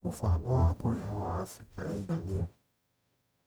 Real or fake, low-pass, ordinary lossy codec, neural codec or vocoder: fake; none; none; codec, 44.1 kHz, 0.9 kbps, DAC